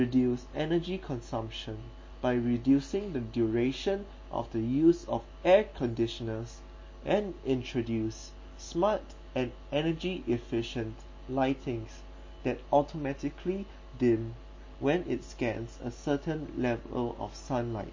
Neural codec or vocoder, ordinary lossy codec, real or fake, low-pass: none; MP3, 32 kbps; real; 7.2 kHz